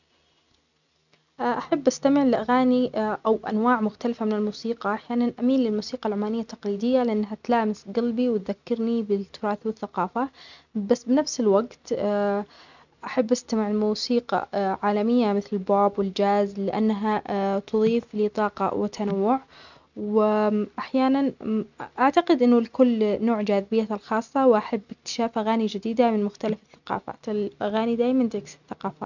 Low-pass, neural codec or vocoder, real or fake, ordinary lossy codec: 7.2 kHz; none; real; none